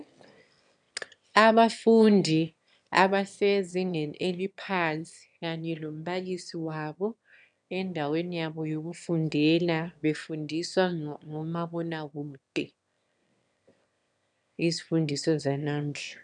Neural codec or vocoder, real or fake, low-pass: autoencoder, 22.05 kHz, a latent of 192 numbers a frame, VITS, trained on one speaker; fake; 9.9 kHz